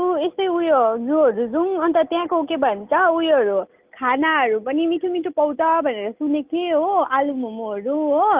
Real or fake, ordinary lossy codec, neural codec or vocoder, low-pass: real; Opus, 32 kbps; none; 3.6 kHz